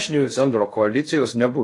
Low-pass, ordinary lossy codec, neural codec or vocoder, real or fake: 10.8 kHz; AAC, 48 kbps; codec, 16 kHz in and 24 kHz out, 0.6 kbps, FocalCodec, streaming, 2048 codes; fake